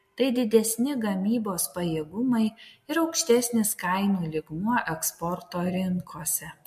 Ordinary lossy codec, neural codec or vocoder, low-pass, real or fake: MP3, 64 kbps; none; 14.4 kHz; real